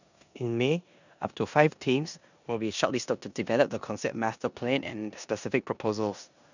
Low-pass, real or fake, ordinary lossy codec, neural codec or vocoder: 7.2 kHz; fake; none; codec, 16 kHz in and 24 kHz out, 0.9 kbps, LongCat-Audio-Codec, four codebook decoder